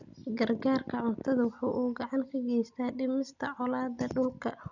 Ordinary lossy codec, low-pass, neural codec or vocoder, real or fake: none; 7.2 kHz; none; real